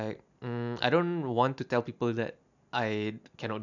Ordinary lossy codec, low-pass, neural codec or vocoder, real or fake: none; 7.2 kHz; none; real